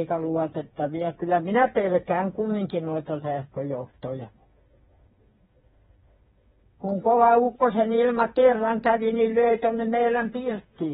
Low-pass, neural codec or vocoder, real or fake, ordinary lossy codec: 7.2 kHz; codec, 16 kHz, 4 kbps, FreqCodec, smaller model; fake; AAC, 16 kbps